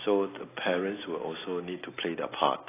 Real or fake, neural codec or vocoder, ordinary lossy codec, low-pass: real; none; AAC, 16 kbps; 3.6 kHz